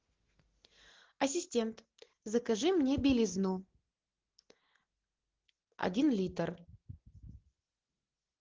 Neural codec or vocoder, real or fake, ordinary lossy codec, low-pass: none; real; Opus, 16 kbps; 7.2 kHz